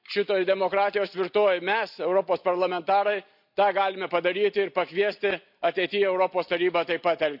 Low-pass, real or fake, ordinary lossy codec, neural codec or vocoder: 5.4 kHz; real; none; none